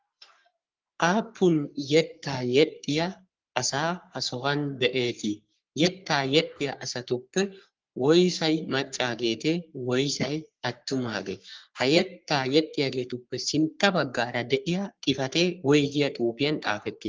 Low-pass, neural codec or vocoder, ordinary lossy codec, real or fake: 7.2 kHz; codec, 44.1 kHz, 3.4 kbps, Pupu-Codec; Opus, 32 kbps; fake